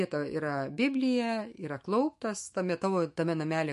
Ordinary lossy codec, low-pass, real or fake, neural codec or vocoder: MP3, 48 kbps; 14.4 kHz; fake; autoencoder, 48 kHz, 128 numbers a frame, DAC-VAE, trained on Japanese speech